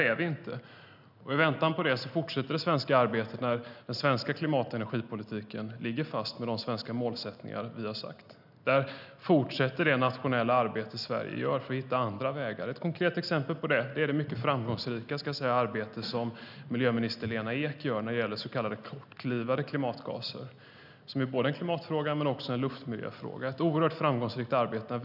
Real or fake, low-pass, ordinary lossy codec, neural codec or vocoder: real; 5.4 kHz; none; none